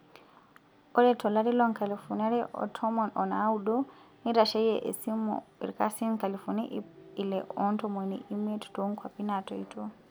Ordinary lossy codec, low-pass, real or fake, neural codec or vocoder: none; none; real; none